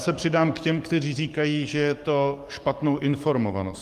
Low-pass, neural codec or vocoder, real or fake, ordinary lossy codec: 14.4 kHz; codec, 44.1 kHz, 7.8 kbps, DAC; fake; Opus, 32 kbps